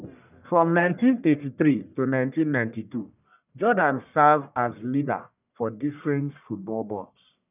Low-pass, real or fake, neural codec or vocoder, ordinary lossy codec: 3.6 kHz; fake; codec, 44.1 kHz, 1.7 kbps, Pupu-Codec; none